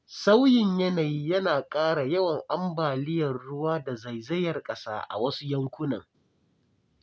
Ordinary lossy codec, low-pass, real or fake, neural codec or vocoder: none; none; real; none